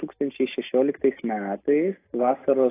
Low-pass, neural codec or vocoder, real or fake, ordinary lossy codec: 3.6 kHz; none; real; AAC, 16 kbps